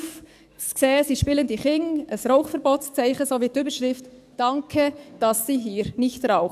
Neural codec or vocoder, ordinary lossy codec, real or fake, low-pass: codec, 44.1 kHz, 7.8 kbps, DAC; none; fake; 14.4 kHz